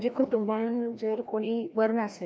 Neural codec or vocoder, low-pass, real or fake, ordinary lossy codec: codec, 16 kHz, 1 kbps, FreqCodec, larger model; none; fake; none